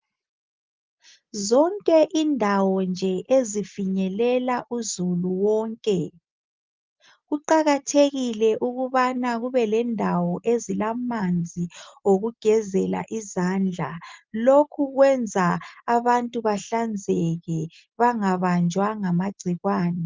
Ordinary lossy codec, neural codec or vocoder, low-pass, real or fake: Opus, 32 kbps; none; 7.2 kHz; real